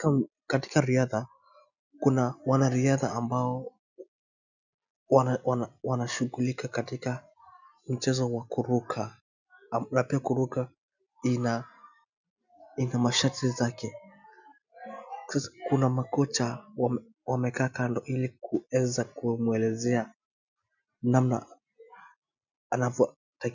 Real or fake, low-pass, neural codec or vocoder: real; 7.2 kHz; none